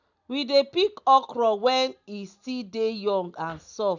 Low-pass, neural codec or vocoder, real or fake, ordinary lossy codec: 7.2 kHz; none; real; none